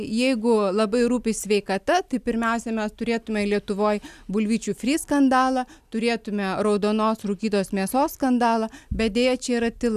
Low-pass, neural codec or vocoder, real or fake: 14.4 kHz; none; real